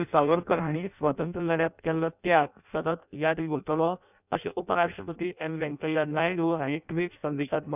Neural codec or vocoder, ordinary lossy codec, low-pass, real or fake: codec, 16 kHz in and 24 kHz out, 0.6 kbps, FireRedTTS-2 codec; none; 3.6 kHz; fake